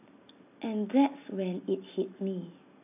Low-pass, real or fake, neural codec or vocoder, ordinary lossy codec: 3.6 kHz; real; none; AAC, 32 kbps